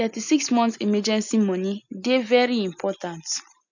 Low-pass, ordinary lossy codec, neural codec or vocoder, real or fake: 7.2 kHz; none; none; real